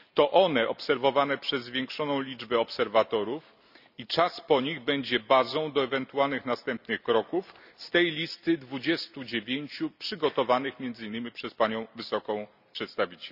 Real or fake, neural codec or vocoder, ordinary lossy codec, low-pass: real; none; none; 5.4 kHz